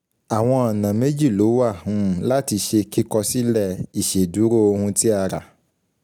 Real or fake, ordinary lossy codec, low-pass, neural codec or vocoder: real; none; none; none